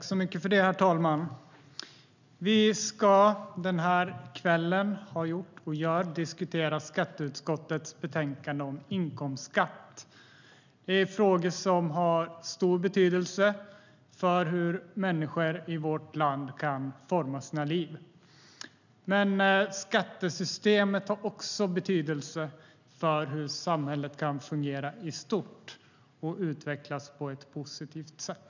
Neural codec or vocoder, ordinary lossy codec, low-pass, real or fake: none; none; 7.2 kHz; real